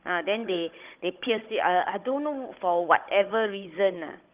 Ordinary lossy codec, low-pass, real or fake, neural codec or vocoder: Opus, 24 kbps; 3.6 kHz; fake; codec, 16 kHz, 16 kbps, FunCodec, trained on Chinese and English, 50 frames a second